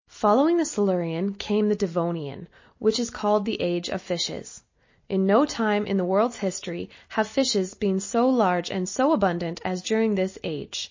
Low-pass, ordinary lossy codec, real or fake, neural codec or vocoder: 7.2 kHz; MP3, 32 kbps; real; none